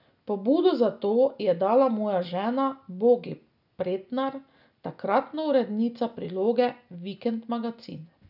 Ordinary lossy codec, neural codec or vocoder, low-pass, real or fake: none; none; 5.4 kHz; real